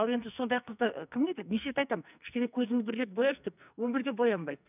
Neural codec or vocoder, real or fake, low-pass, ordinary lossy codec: codec, 44.1 kHz, 2.6 kbps, SNAC; fake; 3.6 kHz; none